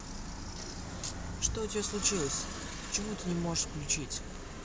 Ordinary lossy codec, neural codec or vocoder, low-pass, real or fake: none; none; none; real